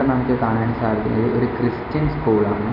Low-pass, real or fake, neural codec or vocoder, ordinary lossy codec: 5.4 kHz; real; none; none